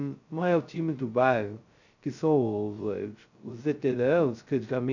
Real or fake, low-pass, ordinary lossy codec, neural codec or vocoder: fake; 7.2 kHz; none; codec, 16 kHz, 0.2 kbps, FocalCodec